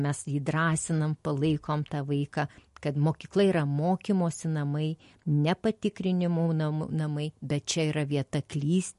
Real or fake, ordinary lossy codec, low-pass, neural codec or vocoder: real; MP3, 48 kbps; 14.4 kHz; none